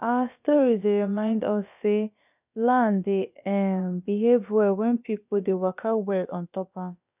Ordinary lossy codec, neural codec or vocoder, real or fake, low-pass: none; codec, 16 kHz, about 1 kbps, DyCAST, with the encoder's durations; fake; 3.6 kHz